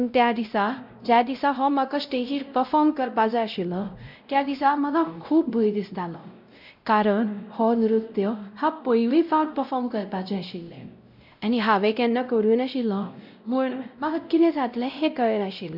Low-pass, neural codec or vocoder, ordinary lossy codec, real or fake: 5.4 kHz; codec, 16 kHz, 0.5 kbps, X-Codec, WavLM features, trained on Multilingual LibriSpeech; none; fake